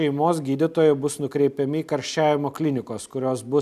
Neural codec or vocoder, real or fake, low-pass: none; real; 14.4 kHz